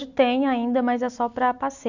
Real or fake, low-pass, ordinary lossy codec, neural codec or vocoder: real; 7.2 kHz; none; none